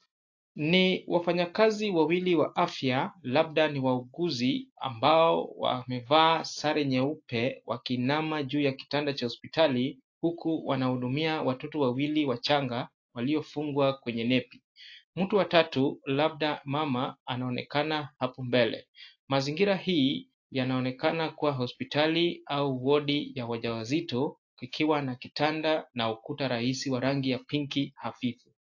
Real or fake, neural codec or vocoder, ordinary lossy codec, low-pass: real; none; AAC, 48 kbps; 7.2 kHz